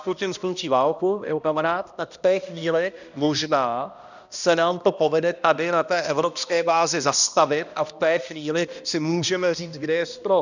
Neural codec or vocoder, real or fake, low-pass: codec, 16 kHz, 1 kbps, X-Codec, HuBERT features, trained on balanced general audio; fake; 7.2 kHz